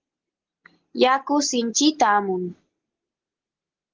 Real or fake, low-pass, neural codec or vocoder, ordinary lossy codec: real; 7.2 kHz; none; Opus, 16 kbps